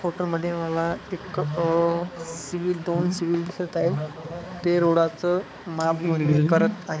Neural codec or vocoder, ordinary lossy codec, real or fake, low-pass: codec, 16 kHz, 4 kbps, X-Codec, HuBERT features, trained on balanced general audio; none; fake; none